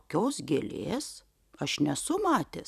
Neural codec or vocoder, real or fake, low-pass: vocoder, 44.1 kHz, 128 mel bands every 256 samples, BigVGAN v2; fake; 14.4 kHz